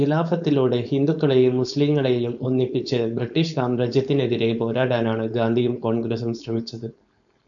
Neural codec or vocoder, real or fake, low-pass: codec, 16 kHz, 4.8 kbps, FACodec; fake; 7.2 kHz